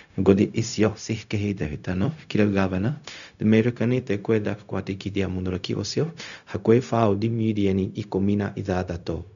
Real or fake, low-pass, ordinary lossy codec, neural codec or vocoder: fake; 7.2 kHz; none; codec, 16 kHz, 0.4 kbps, LongCat-Audio-Codec